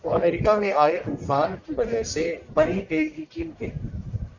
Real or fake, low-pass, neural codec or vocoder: fake; 7.2 kHz; codec, 44.1 kHz, 1.7 kbps, Pupu-Codec